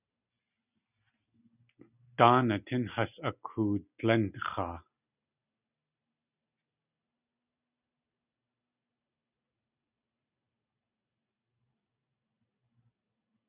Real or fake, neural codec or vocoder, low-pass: real; none; 3.6 kHz